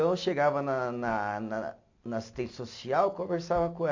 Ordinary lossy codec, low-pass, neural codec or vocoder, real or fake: AAC, 48 kbps; 7.2 kHz; none; real